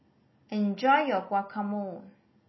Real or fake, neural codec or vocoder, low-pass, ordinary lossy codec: real; none; 7.2 kHz; MP3, 24 kbps